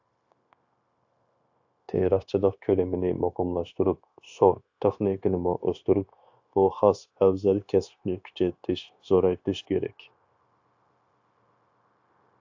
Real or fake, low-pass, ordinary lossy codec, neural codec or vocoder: fake; 7.2 kHz; AAC, 48 kbps; codec, 16 kHz, 0.9 kbps, LongCat-Audio-Codec